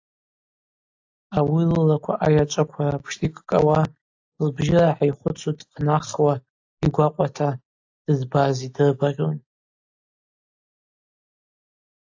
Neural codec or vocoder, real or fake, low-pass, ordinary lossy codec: none; real; 7.2 kHz; AAC, 48 kbps